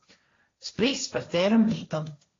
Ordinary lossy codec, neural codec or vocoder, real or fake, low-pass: AAC, 32 kbps; codec, 16 kHz, 1.1 kbps, Voila-Tokenizer; fake; 7.2 kHz